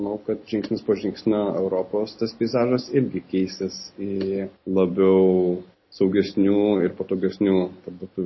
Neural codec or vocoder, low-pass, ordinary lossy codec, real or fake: none; 7.2 kHz; MP3, 24 kbps; real